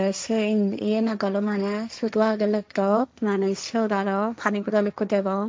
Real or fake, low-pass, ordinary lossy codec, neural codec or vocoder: fake; none; none; codec, 16 kHz, 1.1 kbps, Voila-Tokenizer